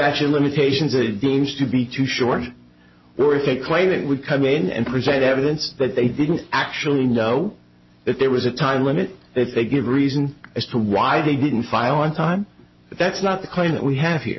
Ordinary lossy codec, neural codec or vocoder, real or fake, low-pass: MP3, 24 kbps; none; real; 7.2 kHz